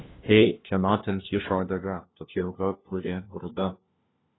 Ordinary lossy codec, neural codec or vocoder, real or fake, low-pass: AAC, 16 kbps; codec, 16 kHz, 1 kbps, FunCodec, trained on LibriTTS, 50 frames a second; fake; 7.2 kHz